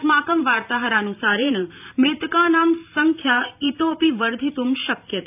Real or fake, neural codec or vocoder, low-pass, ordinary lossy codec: fake; vocoder, 44.1 kHz, 128 mel bands every 512 samples, BigVGAN v2; 3.6 kHz; none